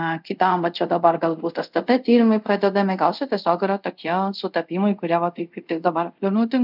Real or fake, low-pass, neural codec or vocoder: fake; 5.4 kHz; codec, 24 kHz, 0.5 kbps, DualCodec